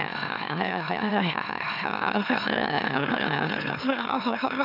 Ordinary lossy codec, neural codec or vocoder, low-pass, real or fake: none; autoencoder, 44.1 kHz, a latent of 192 numbers a frame, MeloTTS; 5.4 kHz; fake